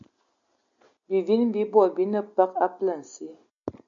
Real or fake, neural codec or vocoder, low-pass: real; none; 7.2 kHz